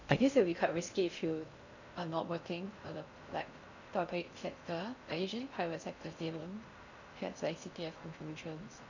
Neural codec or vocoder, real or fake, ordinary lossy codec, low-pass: codec, 16 kHz in and 24 kHz out, 0.6 kbps, FocalCodec, streaming, 2048 codes; fake; none; 7.2 kHz